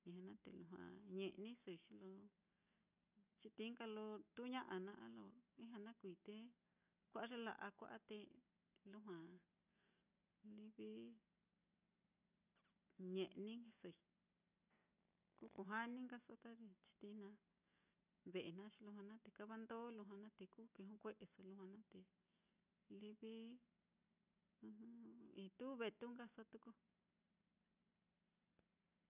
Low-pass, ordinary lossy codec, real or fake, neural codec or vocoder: 3.6 kHz; none; real; none